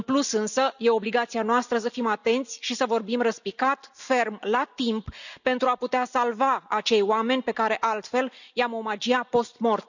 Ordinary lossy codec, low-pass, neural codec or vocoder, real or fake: none; 7.2 kHz; none; real